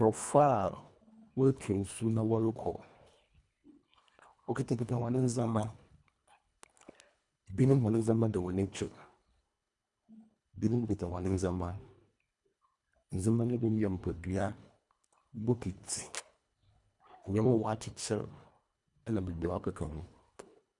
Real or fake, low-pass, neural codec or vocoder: fake; 10.8 kHz; codec, 24 kHz, 1.5 kbps, HILCodec